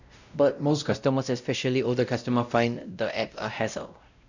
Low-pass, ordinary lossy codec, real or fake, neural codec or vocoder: 7.2 kHz; none; fake; codec, 16 kHz, 0.5 kbps, X-Codec, WavLM features, trained on Multilingual LibriSpeech